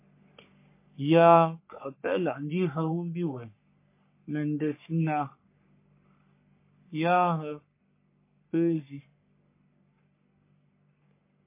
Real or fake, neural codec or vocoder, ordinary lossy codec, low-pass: fake; codec, 44.1 kHz, 3.4 kbps, Pupu-Codec; MP3, 24 kbps; 3.6 kHz